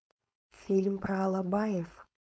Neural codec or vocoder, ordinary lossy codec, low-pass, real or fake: codec, 16 kHz, 4.8 kbps, FACodec; none; none; fake